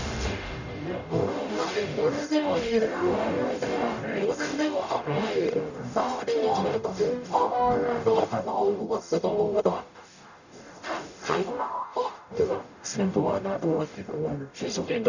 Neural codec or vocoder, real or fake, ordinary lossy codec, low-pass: codec, 44.1 kHz, 0.9 kbps, DAC; fake; none; 7.2 kHz